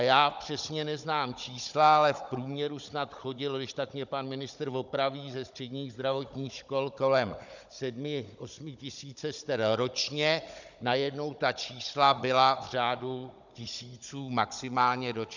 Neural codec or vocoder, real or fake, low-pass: codec, 16 kHz, 16 kbps, FunCodec, trained on Chinese and English, 50 frames a second; fake; 7.2 kHz